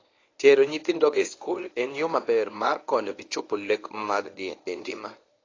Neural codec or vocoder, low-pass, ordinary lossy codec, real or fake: codec, 24 kHz, 0.9 kbps, WavTokenizer, medium speech release version 1; 7.2 kHz; AAC, 32 kbps; fake